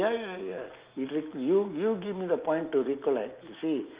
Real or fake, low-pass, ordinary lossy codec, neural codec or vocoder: real; 3.6 kHz; Opus, 32 kbps; none